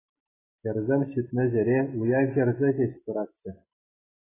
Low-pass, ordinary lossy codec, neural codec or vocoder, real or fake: 3.6 kHz; AAC, 16 kbps; none; real